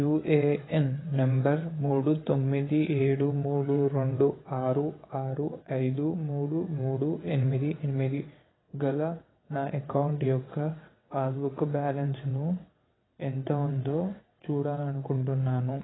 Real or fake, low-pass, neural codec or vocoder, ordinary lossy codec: fake; 7.2 kHz; vocoder, 22.05 kHz, 80 mel bands, WaveNeXt; AAC, 16 kbps